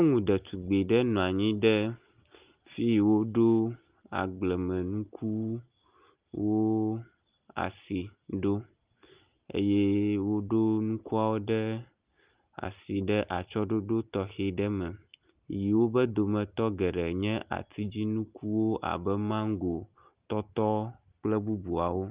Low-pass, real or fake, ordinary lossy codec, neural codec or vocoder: 3.6 kHz; real; Opus, 32 kbps; none